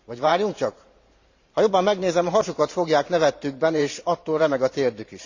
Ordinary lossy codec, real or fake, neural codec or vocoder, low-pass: Opus, 64 kbps; fake; vocoder, 44.1 kHz, 128 mel bands every 512 samples, BigVGAN v2; 7.2 kHz